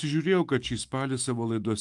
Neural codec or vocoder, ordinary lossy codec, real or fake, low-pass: autoencoder, 48 kHz, 128 numbers a frame, DAC-VAE, trained on Japanese speech; Opus, 32 kbps; fake; 10.8 kHz